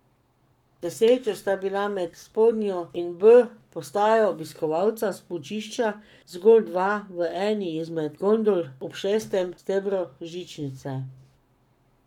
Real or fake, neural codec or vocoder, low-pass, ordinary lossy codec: fake; codec, 44.1 kHz, 7.8 kbps, Pupu-Codec; 19.8 kHz; none